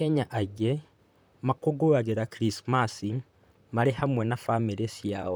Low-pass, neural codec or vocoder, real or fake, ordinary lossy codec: none; vocoder, 44.1 kHz, 128 mel bands, Pupu-Vocoder; fake; none